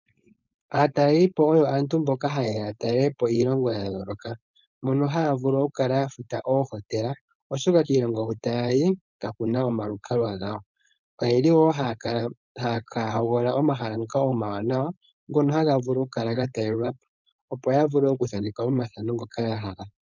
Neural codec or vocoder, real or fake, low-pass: codec, 16 kHz, 4.8 kbps, FACodec; fake; 7.2 kHz